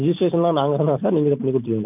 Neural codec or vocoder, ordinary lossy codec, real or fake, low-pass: vocoder, 44.1 kHz, 128 mel bands every 256 samples, BigVGAN v2; none; fake; 3.6 kHz